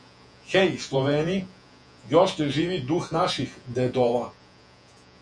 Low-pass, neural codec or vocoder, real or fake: 9.9 kHz; vocoder, 48 kHz, 128 mel bands, Vocos; fake